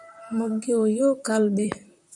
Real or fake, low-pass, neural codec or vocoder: fake; 10.8 kHz; vocoder, 44.1 kHz, 128 mel bands, Pupu-Vocoder